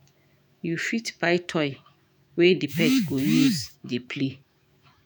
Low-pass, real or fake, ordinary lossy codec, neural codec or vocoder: none; fake; none; autoencoder, 48 kHz, 128 numbers a frame, DAC-VAE, trained on Japanese speech